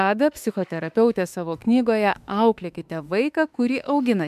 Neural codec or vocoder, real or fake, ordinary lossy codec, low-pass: autoencoder, 48 kHz, 32 numbers a frame, DAC-VAE, trained on Japanese speech; fake; MP3, 96 kbps; 14.4 kHz